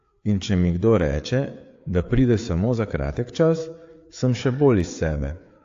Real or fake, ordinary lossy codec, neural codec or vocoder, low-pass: fake; AAC, 48 kbps; codec, 16 kHz, 4 kbps, FreqCodec, larger model; 7.2 kHz